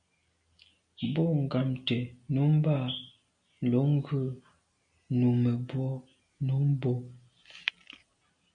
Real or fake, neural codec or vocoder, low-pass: real; none; 9.9 kHz